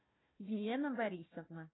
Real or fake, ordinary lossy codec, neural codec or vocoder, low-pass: fake; AAC, 16 kbps; codec, 16 kHz, 1 kbps, FunCodec, trained on Chinese and English, 50 frames a second; 7.2 kHz